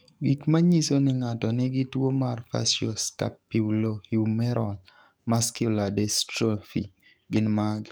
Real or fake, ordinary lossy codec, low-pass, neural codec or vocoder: fake; none; none; codec, 44.1 kHz, 7.8 kbps, DAC